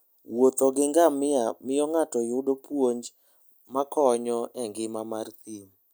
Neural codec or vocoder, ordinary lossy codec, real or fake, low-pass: none; none; real; none